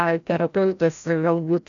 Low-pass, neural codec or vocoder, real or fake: 7.2 kHz; codec, 16 kHz, 0.5 kbps, FreqCodec, larger model; fake